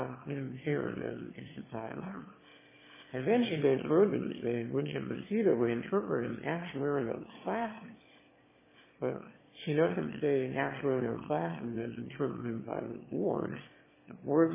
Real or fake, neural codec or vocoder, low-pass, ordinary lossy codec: fake; autoencoder, 22.05 kHz, a latent of 192 numbers a frame, VITS, trained on one speaker; 3.6 kHz; MP3, 16 kbps